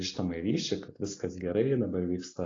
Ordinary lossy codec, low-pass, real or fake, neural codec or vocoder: AAC, 32 kbps; 7.2 kHz; real; none